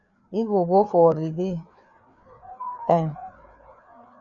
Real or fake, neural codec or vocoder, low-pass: fake; codec, 16 kHz, 4 kbps, FreqCodec, larger model; 7.2 kHz